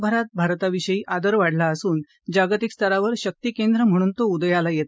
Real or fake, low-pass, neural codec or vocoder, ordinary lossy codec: real; none; none; none